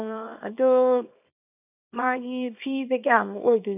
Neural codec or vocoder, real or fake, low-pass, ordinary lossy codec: codec, 24 kHz, 0.9 kbps, WavTokenizer, small release; fake; 3.6 kHz; none